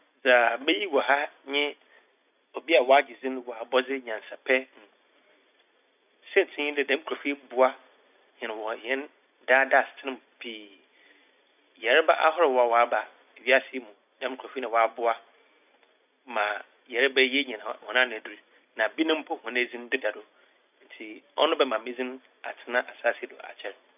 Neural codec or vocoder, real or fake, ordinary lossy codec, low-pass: none; real; none; 3.6 kHz